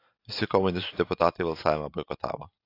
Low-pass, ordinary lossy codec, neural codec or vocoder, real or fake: 5.4 kHz; AAC, 32 kbps; none; real